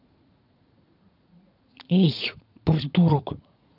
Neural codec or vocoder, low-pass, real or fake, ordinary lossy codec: vocoder, 44.1 kHz, 80 mel bands, Vocos; 5.4 kHz; fake; none